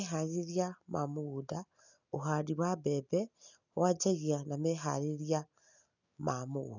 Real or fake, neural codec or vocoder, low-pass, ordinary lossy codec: real; none; 7.2 kHz; none